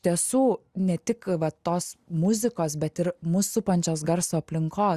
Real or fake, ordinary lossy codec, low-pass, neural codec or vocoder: real; Opus, 64 kbps; 14.4 kHz; none